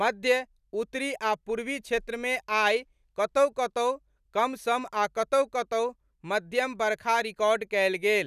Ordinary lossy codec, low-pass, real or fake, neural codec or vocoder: none; 14.4 kHz; real; none